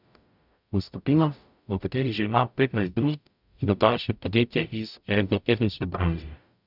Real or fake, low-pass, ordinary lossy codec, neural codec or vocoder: fake; 5.4 kHz; none; codec, 44.1 kHz, 0.9 kbps, DAC